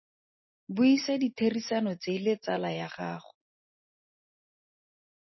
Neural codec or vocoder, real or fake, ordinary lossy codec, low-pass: none; real; MP3, 24 kbps; 7.2 kHz